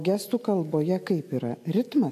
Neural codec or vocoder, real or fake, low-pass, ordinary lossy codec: none; real; 14.4 kHz; AAC, 64 kbps